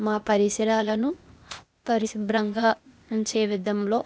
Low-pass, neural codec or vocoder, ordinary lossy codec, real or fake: none; codec, 16 kHz, 0.8 kbps, ZipCodec; none; fake